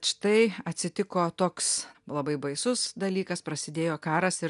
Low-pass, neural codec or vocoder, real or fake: 10.8 kHz; none; real